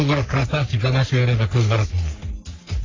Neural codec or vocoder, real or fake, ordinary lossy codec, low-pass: codec, 44.1 kHz, 3.4 kbps, Pupu-Codec; fake; AAC, 32 kbps; 7.2 kHz